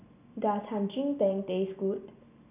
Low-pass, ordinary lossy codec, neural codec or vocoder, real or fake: 3.6 kHz; none; none; real